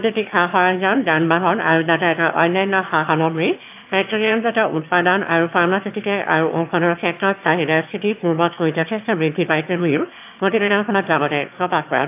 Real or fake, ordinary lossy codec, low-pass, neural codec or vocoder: fake; AAC, 32 kbps; 3.6 kHz; autoencoder, 22.05 kHz, a latent of 192 numbers a frame, VITS, trained on one speaker